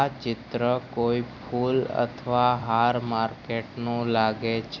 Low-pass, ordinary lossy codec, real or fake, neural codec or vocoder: 7.2 kHz; none; fake; vocoder, 44.1 kHz, 128 mel bands every 256 samples, BigVGAN v2